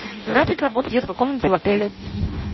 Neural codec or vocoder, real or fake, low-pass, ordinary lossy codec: codec, 16 kHz in and 24 kHz out, 0.6 kbps, FireRedTTS-2 codec; fake; 7.2 kHz; MP3, 24 kbps